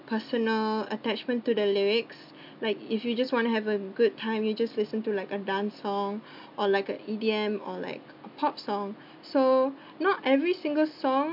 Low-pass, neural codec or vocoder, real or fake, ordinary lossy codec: 5.4 kHz; none; real; MP3, 48 kbps